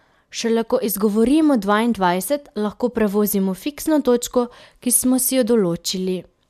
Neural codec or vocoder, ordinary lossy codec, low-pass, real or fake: none; MP3, 96 kbps; 14.4 kHz; real